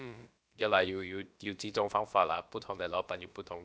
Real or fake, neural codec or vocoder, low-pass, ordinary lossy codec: fake; codec, 16 kHz, about 1 kbps, DyCAST, with the encoder's durations; none; none